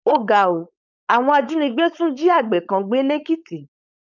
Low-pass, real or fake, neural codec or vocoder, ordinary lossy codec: 7.2 kHz; fake; codec, 16 kHz, 4.8 kbps, FACodec; none